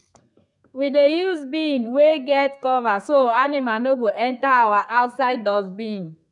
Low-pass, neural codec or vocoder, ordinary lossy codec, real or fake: 10.8 kHz; codec, 32 kHz, 1.9 kbps, SNAC; none; fake